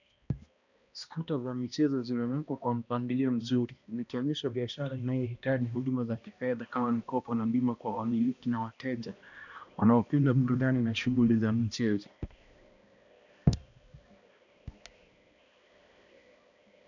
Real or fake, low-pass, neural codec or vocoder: fake; 7.2 kHz; codec, 16 kHz, 1 kbps, X-Codec, HuBERT features, trained on balanced general audio